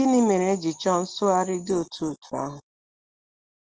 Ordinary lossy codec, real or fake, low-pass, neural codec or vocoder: Opus, 16 kbps; real; 7.2 kHz; none